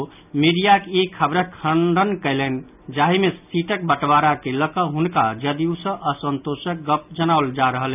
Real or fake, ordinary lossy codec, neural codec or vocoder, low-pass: real; none; none; 3.6 kHz